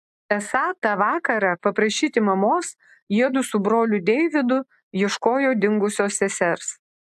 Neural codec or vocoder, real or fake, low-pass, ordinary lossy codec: none; real; 14.4 kHz; AAC, 96 kbps